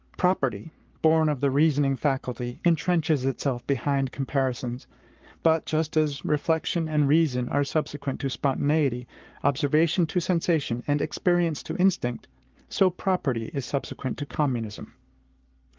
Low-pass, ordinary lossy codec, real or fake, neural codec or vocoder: 7.2 kHz; Opus, 32 kbps; fake; codec, 44.1 kHz, 7.8 kbps, Pupu-Codec